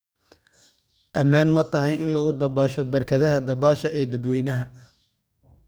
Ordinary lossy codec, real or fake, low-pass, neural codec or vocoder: none; fake; none; codec, 44.1 kHz, 2.6 kbps, DAC